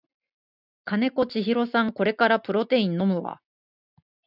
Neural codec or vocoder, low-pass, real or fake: none; 5.4 kHz; real